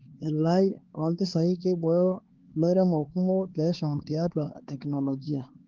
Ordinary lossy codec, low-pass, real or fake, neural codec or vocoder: Opus, 16 kbps; 7.2 kHz; fake; codec, 16 kHz, 2 kbps, X-Codec, HuBERT features, trained on LibriSpeech